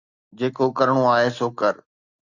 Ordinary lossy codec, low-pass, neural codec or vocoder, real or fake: Opus, 64 kbps; 7.2 kHz; none; real